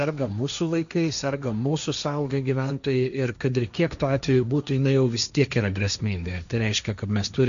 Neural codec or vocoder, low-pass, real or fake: codec, 16 kHz, 1.1 kbps, Voila-Tokenizer; 7.2 kHz; fake